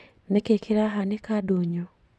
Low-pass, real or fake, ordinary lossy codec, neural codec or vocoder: none; fake; none; vocoder, 24 kHz, 100 mel bands, Vocos